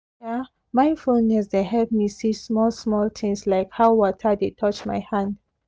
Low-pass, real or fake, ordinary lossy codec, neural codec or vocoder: none; real; none; none